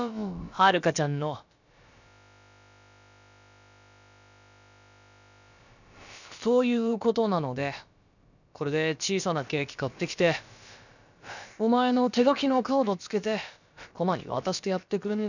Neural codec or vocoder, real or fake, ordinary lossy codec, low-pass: codec, 16 kHz, about 1 kbps, DyCAST, with the encoder's durations; fake; none; 7.2 kHz